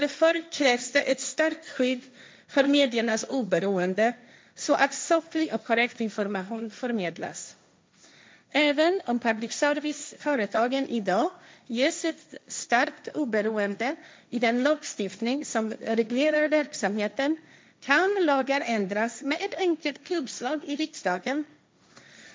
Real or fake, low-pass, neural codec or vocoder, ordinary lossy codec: fake; none; codec, 16 kHz, 1.1 kbps, Voila-Tokenizer; none